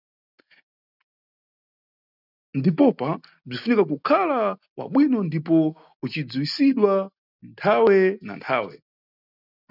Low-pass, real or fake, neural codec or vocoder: 5.4 kHz; real; none